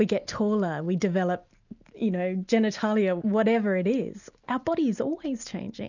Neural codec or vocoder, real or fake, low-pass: none; real; 7.2 kHz